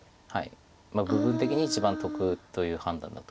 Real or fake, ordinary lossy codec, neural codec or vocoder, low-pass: real; none; none; none